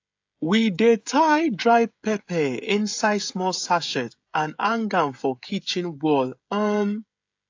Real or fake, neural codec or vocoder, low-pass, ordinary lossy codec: fake; codec, 16 kHz, 16 kbps, FreqCodec, smaller model; 7.2 kHz; AAC, 48 kbps